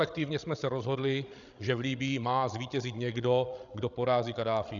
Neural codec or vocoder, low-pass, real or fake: codec, 16 kHz, 16 kbps, FreqCodec, larger model; 7.2 kHz; fake